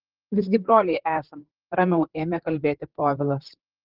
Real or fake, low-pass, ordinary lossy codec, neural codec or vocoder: fake; 5.4 kHz; Opus, 16 kbps; vocoder, 44.1 kHz, 128 mel bands, Pupu-Vocoder